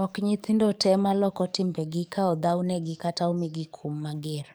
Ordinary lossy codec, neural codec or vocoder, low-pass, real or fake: none; codec, 44.1 kHz, 7.8 kbps, DAC; none; fake